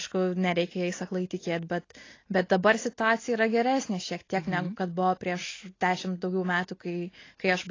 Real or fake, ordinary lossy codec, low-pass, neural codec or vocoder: real; AAC, 32 kbps; 7.2 kHz; none